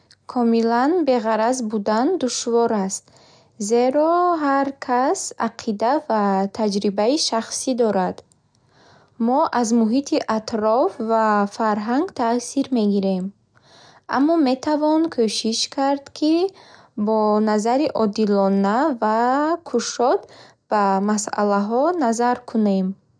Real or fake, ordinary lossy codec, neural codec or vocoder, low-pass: real; none; none; 9.9 kHz